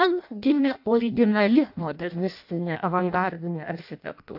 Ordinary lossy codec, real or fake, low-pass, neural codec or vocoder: AAC, 48 kbps; fake; 5.4 kHz; codec, 16 kHz in and 24 kHz out, 0.6 kbps, FireRedTTS-2 codec